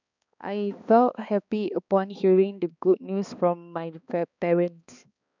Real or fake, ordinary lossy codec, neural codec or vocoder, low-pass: fake; none; codec, 16 kHz, 2 kbps, X-Codec, HuBERT features, trained on balanced general audio; 7.2 kHz